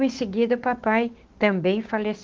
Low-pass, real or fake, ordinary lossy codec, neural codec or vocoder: 7.2 kHz; fake; Opus, 16 kbps; codec, 16 kHz, 6 kbps, DAC